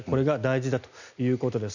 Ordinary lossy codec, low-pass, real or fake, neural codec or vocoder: none; 7.2 kHz; real; none